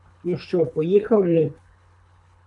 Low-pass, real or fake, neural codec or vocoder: 10.8 kHz; fake; codec, 24 kHz, 3 kbps, HILCodec